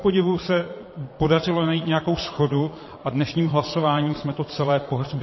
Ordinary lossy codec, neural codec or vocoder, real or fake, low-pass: MP3, 24 kbps; vocoder, 22.05 kHz, 80 mel bands, Vocos; fake; 7.2 kHz